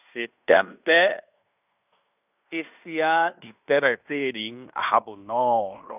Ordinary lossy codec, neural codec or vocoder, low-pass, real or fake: none; codec, 16 kHz in and 24 kHz out, 0.9 kbps, LongCat-Audio-Codec, fine tuned four codebook decoder; 3.6 kHz; fake